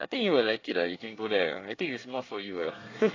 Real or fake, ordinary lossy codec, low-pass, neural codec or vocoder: fake; AAC, 32 kbps; 7.2 kHz; codec, 24 kHz, 1 kbps, SNAC